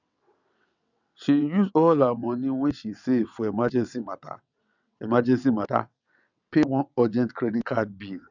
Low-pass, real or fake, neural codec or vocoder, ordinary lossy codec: 7.2 kHz; fake; vocoder, 22.05 kHz, 80 mel bands, WaveNeXt; none